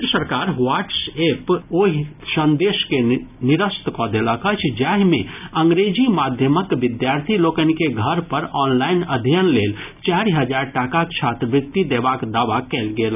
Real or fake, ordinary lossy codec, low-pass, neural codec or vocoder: real; none; 3.6 kHz; none